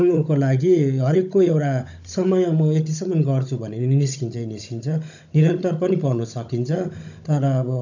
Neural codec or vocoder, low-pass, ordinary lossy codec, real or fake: codec, 16 kHz, 16 kbps, FunCodec, trained on Chinese and English, 50 frames a second; 7.2 kHz; none; fake